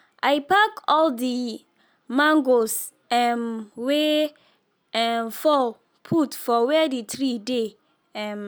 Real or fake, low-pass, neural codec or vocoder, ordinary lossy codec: real; none; none; none